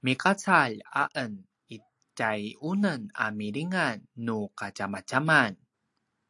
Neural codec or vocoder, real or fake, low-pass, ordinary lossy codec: none; real; 10.8 kHz; AAC, 64 kbps